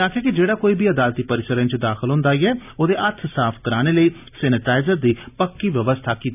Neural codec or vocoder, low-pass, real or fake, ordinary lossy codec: none; 3.6 kHz; real; none